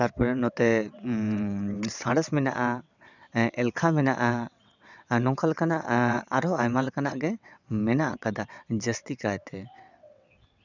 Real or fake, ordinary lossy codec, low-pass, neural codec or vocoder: fake; none; 7.2 kHz; vocoder, 22.05 kHz, 80 mel bands, WaveNeXt